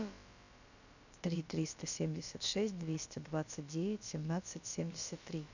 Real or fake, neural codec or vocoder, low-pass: fake; codec, 16 kHz, about 1 kbps, DyCAST, with the encoder's durations; 7.2 kHz